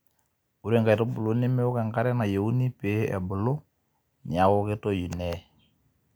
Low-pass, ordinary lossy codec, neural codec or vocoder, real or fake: none; none; none; real